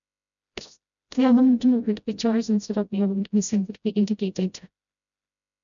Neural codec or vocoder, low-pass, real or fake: codec, 16 kHz, 0.5 kbps, FreqCodec, smaller model; 7.2 kHz; fake